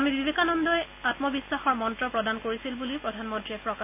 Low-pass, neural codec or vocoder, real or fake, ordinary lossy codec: 3.6 kHz; none; real; none